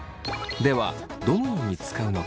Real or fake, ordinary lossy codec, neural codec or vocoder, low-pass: real; none; none; none